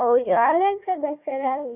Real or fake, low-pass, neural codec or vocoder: fake; 3.6 kHz; codec, 16 kHz, 1 kbps, FunCodec, trained on Chinese and English, 50 frames a second